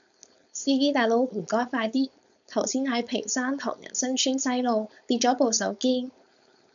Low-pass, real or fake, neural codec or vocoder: 7.2 kHz; fake; codec, 16 kHz, 4.8 kbps, FACodec